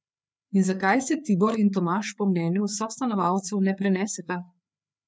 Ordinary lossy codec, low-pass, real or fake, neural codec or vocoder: none; none; fake; codec, 16 kHz, 4 kbps, FreqCodec, larger model